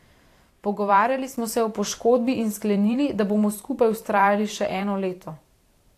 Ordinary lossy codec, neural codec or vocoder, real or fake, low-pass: AAC, 64 kbps; vocoder, 44.1 kHz, 128 mel bands every 512 samples, BigVGAN v2; fake; 14.4 kHz